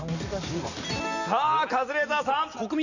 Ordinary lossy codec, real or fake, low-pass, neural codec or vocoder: none; real; 7.2 kHz; none